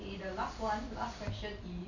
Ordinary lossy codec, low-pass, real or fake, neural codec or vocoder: none; 7.2 kHz; real; none